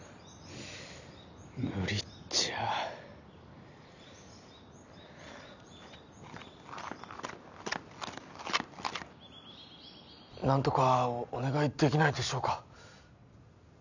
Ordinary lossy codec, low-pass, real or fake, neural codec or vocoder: none; 7.2 kHz; real; none